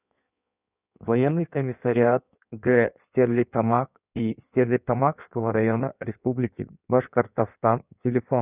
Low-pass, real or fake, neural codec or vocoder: 3.6 kHz; fake; codec, 16 kHz in and 24 kHz out, 1.1 kbps, FireRedTTS-2 codec